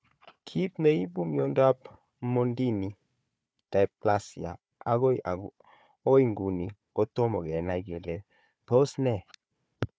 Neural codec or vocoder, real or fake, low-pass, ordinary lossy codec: codec, 16 kHz, 4 kbps, FunCodec, trained on Chinese and English, 50 frames a second; fake; none; none